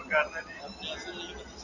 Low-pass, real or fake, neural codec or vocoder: 7.2 kHz; real; none